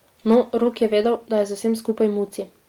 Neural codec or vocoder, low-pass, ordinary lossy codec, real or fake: none; 19.8 kHz; Opus, 24 kbps; real